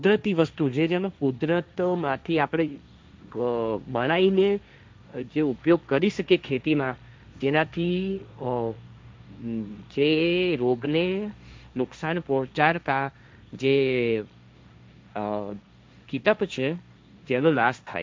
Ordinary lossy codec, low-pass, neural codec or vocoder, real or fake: none; none; codec, 16 kHz, 1.1 kbps, Voila-Tokenizer; fake